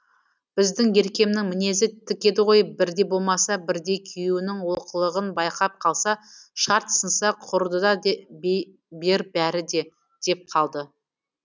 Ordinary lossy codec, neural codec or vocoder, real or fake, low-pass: none; none; real; none